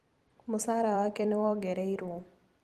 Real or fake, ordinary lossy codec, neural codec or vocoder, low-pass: fake; Opus, 24 kbps; vocoder, 44.1 kHz, 128 mel bands every 256 samples, BigVGAN v2; 14.4 kHz